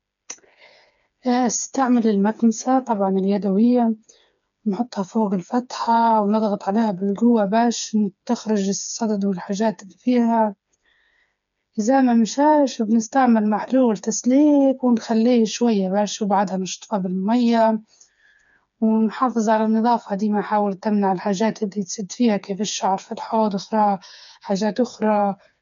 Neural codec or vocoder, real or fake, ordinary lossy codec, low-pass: codec, 16 kHz, 4 kbps, FreqCodec, smaller model; fake; none; 7.2 kHz